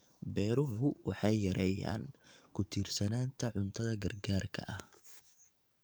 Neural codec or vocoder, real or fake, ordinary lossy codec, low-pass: codec, 44.1 kHz, 7.8 kbps, DAC; fake; none; none